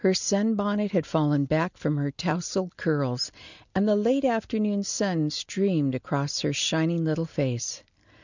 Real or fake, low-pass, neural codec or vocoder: real; 7.2 kHz; none